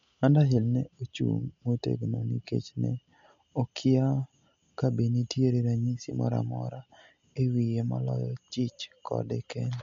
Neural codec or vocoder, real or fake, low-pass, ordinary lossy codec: none; real; 7.2 kHz; MP3, 48 kbps